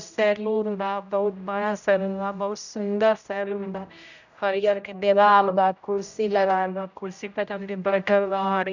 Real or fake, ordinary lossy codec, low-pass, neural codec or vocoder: fake; none; 7.2 kHz; codec, 16 kHz, 0.5 kbps, X-Codec, HuBERT features, trained on general audio